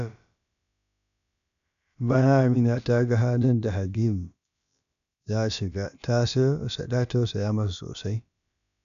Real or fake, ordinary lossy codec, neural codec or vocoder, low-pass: fake; none; codec, 16 kHz, about 1 kbps, DyCAST, with the encoder's durations; 7.2 kHz